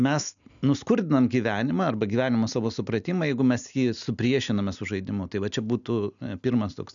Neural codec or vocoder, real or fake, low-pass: none; real; 7.2 kHz